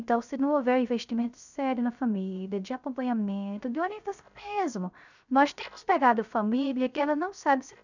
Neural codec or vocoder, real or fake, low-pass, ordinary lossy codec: codec, 16 kHz, 0.3 kbps, FocalCodec; fake; 7.2 kHz; none